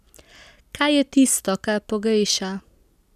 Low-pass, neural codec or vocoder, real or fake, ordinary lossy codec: 14.4 kHz; none; real; none